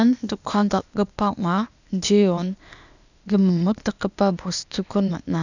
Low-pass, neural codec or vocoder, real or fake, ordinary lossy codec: 7.2 kHz; codec, 16 kHz, 0.8 kbps, ZipCodec; fake; none